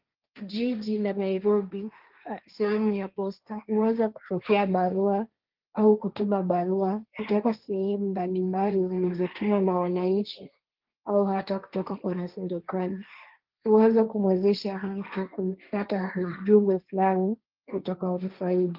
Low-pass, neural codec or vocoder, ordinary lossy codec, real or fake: 5.4 kHz; codec, 16 kHz, 1.1 kbps, Voila-Tokenizer; Opus, 24 kbps; fake